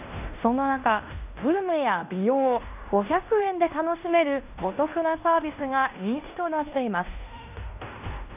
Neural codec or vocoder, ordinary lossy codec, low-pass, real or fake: codec, 16 kHz in and 24 kHz out, 0.9 kbps, LongCat-Audio-Codec, fine tuned four codebook decoder; none; 3.6 kHz; fake